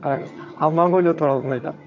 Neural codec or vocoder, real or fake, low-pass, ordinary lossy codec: vocoder, 22.05 kHz, 80 mel bands, HiFi-GAN; fake; 7.2 kHz; MP3, 48 kbps